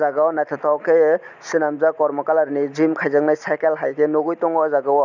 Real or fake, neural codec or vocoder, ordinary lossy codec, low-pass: real; none; none; 7.2 kHz